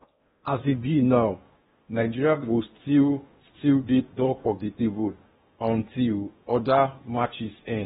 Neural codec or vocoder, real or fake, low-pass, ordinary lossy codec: codec, 16 kHz in and 24 kHz out, 0.8 kbps, FocalCodec, streaming, 65536 codes; fake; 10.8 kHz; AAC, 16 kbps